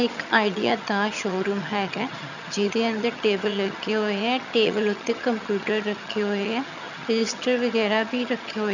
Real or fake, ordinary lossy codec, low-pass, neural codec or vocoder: fake; none; 7.2 kHz; vocoder, 22.05 kHz, 80 mel bands, HiFi-GAN